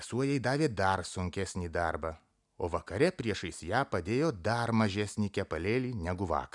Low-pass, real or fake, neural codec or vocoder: 10.8 kHz; real; none